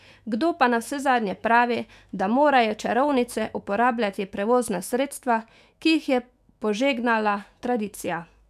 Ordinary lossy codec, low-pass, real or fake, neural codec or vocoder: none; 14.4 kHz; fake; autoencoder, 48 kHz, 128 numbers a frame, DAC-VAE, trained on Japanese speech